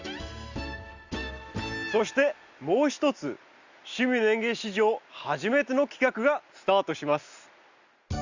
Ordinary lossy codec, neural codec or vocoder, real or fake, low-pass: Opus, 64 kbps; none; real; 7.2 kHz